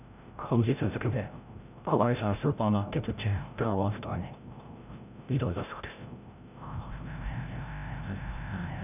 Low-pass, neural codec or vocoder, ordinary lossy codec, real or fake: 3.6 kHz; codec, 16 kHz, 0.5 kbps, FreqCodec, larger model; none; fake